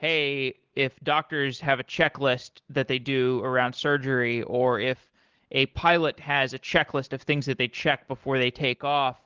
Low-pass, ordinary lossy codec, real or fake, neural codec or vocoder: 7.2 kHz; Opus, 16 kbps; real; none